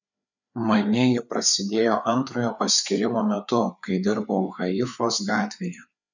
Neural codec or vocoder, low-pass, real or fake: codec, 16 kHz, 4 kbps, FreqCodec, larger model; 7.2 kHz; fake